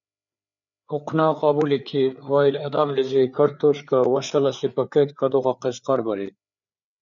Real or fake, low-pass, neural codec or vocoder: fake; 7.2 kHz; codec, 16 kHz, 4 kbps, FreqCodec, larger model